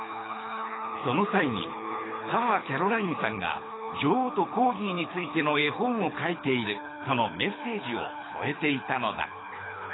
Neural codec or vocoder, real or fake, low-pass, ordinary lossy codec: codec, 24 kHz, 6 kbps, HILCodec; fake; 7.2 kHz; AAC, 16 kbps